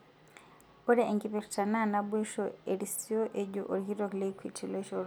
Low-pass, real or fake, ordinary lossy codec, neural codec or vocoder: none; real; none; none